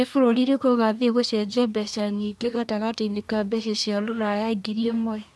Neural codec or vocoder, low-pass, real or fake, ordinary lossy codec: codec, 24 kHz, 1 kbps, SNAC; none; fake; none